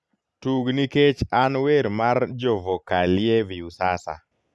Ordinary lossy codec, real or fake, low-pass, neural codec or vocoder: none; real; none; none